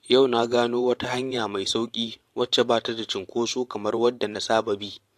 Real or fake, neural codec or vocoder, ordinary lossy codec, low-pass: fake; vocoder, 44.1 kHz, 128 mel bands every 512 samples, BigVGAN v2; AAC, 64 kbps; 14.4 kHz